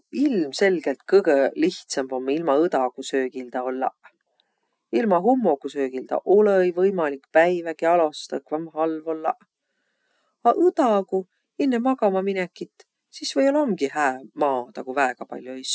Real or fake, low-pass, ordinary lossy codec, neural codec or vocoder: real; none; none; none